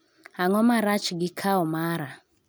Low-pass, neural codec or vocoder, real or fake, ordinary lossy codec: none; none; real; none